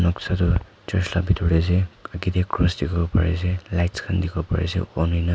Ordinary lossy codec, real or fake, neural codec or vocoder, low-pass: none; real; none; none